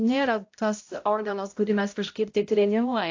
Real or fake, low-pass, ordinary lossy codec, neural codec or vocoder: fake; 7.2 kHz; AAC, 48 kbps; codec, 16 kHz, 0.5 kbps, X-Codec, HuBERT features, trained on balanced general audio